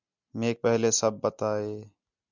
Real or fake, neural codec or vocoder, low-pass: real; none; 7.2 kHz